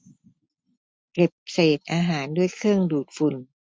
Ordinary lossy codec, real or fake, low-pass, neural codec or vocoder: none; real; none; none